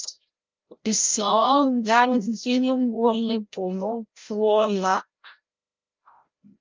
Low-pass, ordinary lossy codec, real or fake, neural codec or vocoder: 7.2 kHz; Opus, 24 kbps; fake; codec, 16 kHz, 0.5 kbps, FreqCodec, larger model